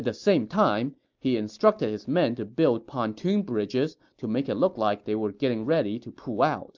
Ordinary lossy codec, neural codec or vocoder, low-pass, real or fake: MP3, 64 kbps; none; 7.2 kHz; real